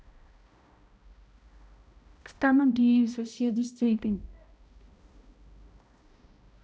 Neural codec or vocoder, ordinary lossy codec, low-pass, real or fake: codec, 16 kHz, 0.5 kbps, X-Codec, HuBERT features, trained on balanced general audio; none; none; fake